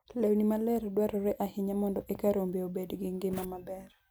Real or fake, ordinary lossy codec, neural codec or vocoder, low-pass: real; none; none; none